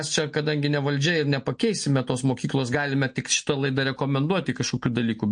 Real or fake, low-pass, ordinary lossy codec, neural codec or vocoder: real; 9.9 kHz; MP3, 48 kbps; none